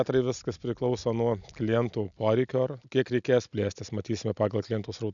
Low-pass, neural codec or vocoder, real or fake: 7.2 kHz; none; real